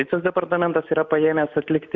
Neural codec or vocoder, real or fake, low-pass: none; real; 7.2 kHz